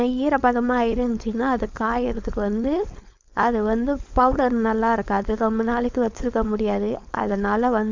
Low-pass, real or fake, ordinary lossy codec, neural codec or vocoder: 7.2 kHz; fake; MP3, 64 kbps; codec, 16 kHz, 4.8 kbps, FACodec